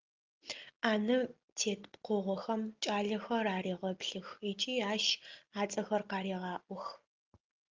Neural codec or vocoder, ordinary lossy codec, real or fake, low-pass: none; Opus, 32 kbps; real; 7.2 kHz